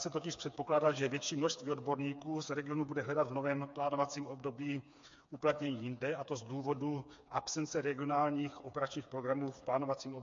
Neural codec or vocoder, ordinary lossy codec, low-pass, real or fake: codec, 16 kHz, 4 kbps, FreqCodec, smaller model; MP3, 48 kbps; 7.2 kHz; fake